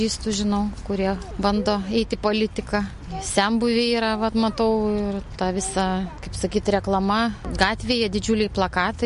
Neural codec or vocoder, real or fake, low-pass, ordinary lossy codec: none; real; 10.8 kHz; MP3, 48 kbps